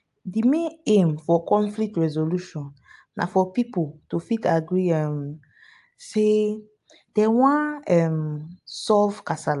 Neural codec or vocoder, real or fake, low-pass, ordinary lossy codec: none; real; 10.8 kHz; AAC, 64 kbps